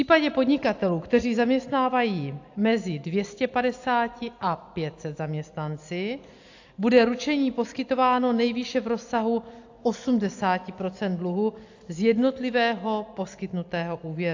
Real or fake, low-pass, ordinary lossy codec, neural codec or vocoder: real; 7.2 kHz; AAC, 48 kbps; none